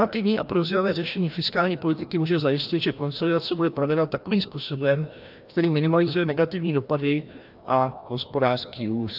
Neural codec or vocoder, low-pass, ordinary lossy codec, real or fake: codec, 16 kHz, 1 kbps, FreqCodec, larger model; 5.4 kHz; AAC, 48 kbps; fake